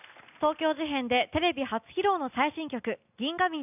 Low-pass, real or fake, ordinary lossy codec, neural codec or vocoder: 3.6 kHz; real; none; none